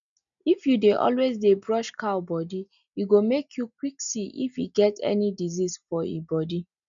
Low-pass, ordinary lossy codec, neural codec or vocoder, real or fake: 7.2 kHz; none; none; real